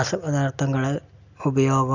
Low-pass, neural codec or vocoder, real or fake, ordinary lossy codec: 7.2 kHz; none; real; none